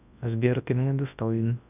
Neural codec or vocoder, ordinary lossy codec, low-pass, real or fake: codec, 24 kHz, 0.9 kbps, WavTokenizer, large speech release; none; 3.6 kHz; fake